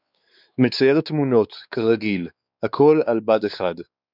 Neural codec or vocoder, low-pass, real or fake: codec, 16 kHz, 4 kbps, X-Codec, WavLM features, trained on Multilingual LibriSpeech; 5.4 kHz; fake